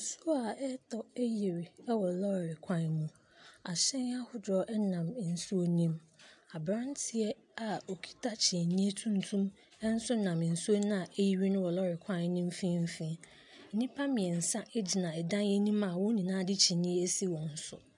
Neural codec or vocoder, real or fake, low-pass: none; real; 10.8 kHz